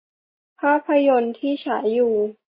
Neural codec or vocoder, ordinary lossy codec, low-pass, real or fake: none; MP3, 24 kbps; 5.4 kHz; real